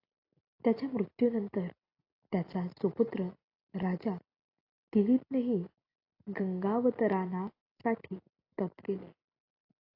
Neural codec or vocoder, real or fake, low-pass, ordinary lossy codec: none; real; 5.4 kHz; AAC, 32 kbps